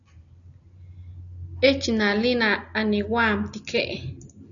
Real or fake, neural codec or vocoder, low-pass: real; none; 7.2 kHz